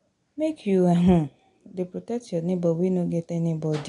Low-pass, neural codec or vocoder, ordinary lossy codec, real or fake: 10.8 kHz; none; AAC, 48 kbps; real